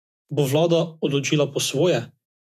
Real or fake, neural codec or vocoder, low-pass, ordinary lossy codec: fake; vocoder, 48 kHz, 128 mel bands, Vocos; 14.4 kHz; none